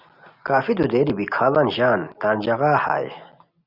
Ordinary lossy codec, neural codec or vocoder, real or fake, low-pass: Opus, 64 kbps; none; real; 5.4 kHz